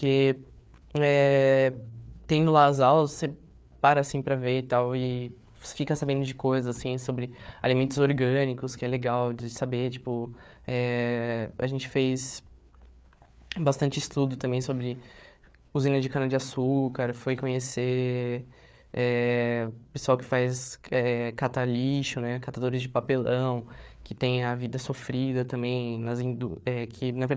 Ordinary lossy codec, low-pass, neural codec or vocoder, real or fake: none; none; codec, 16 kHz, 4 kbps, FreqCodec, larger model; fake